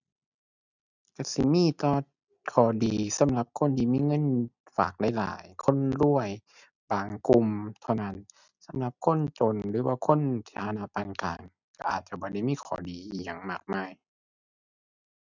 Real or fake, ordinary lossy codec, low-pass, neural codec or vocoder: real; none; 7.2 kHz; none